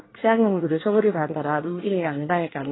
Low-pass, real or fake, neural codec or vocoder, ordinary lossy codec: 7.2 kHz; fake; codec, 24 kHz, 1 kbps, SNAC; AAC, 16 kbps